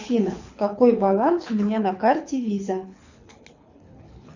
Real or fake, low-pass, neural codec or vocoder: fake; 7.2 kHz; codec, 24 kHz, 6 kbps, HILCodec